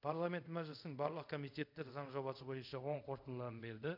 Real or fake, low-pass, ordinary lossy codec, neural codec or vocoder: fake; 5.4 kHz; none; codec, 24 kHz, 0.5 kbps, DualCodec